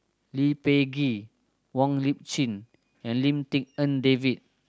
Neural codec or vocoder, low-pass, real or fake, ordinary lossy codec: none; none; real; none